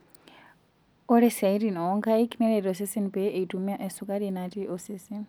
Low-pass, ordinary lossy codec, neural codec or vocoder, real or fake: none; none; none; real